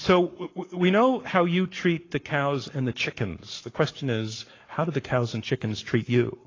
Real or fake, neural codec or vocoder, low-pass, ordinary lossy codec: fake; codec, 44.1 kHz, 7.8 kbps, Pupu-Codec; 7.2 kHz; AAC, 32 kbps